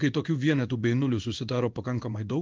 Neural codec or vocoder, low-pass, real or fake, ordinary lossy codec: codec, 16 kHz in and 24 kHz out, 1 kbps, XY-Tokenizer; 7.2 kHz; fake; Opus, 32 kbps